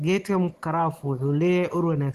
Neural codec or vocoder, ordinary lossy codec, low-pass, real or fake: none; Opus, 16 kbps; 14.4 kHz; real